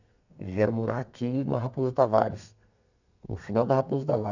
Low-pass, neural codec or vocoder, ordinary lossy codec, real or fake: 7.2 kHz; codec, 32 kHz, 1.9 kbps, SNAC; none; fake